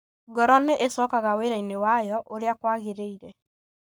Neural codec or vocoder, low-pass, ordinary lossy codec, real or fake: codec, 44.1 kHz, 7.8 kbps, Pupu-Codec; none; none; fake